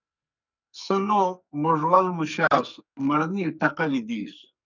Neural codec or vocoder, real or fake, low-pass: codec, 44.1 kHz, 2.6 kbps, SNAC; fake; 7.2 kHz